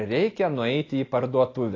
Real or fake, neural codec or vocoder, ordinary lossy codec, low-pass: real; none; AAC, 32 kbps; 7.2 kHz